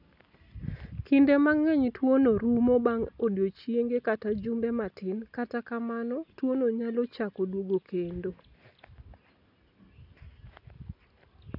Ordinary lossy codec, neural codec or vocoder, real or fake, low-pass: none; none; real; 5.4 kHz